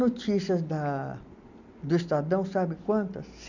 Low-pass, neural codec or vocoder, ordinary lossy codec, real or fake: 7.2 kHz; none; none; real